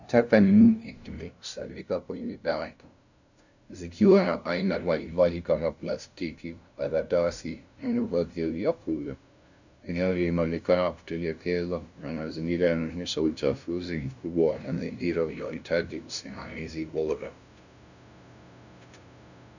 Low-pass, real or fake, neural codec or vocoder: 7.2 kHz; fake; codec, 16 kHz, 0.5 kbps, FunCodec, trained on LibriTTS, 25 frames a second